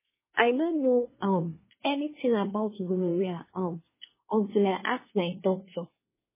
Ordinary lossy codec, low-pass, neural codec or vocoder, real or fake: MP3, 16 kbps; 3.6 kHz; codec, 24 kHz, 1 kbps, SNAC; fake